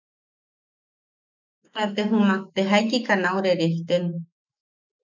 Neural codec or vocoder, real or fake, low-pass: autoencoder, 48 kHz, 128 numbers a frame, DAC-VAE, trained on Japanese speech; fake; 7.2 kHz